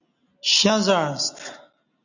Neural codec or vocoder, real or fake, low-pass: none; real; 7.2 kHz